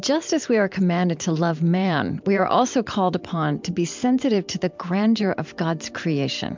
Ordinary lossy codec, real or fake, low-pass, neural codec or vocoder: MP3, 64 kbps; fake; 7.2 kHz; vocoder, 44.1 kHz, 128 mel bands every 512 samples, BigVGAN v2